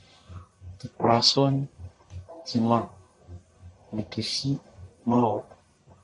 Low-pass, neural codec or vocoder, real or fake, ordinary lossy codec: 10.8 kHz; codec, 44.1 kHz, 1.7 kbps, Pupu-Codec; fake; MP3, 96 kbps